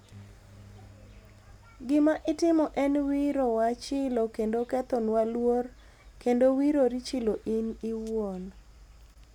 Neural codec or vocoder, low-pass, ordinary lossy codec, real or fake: none; 19.8 kHz; none; real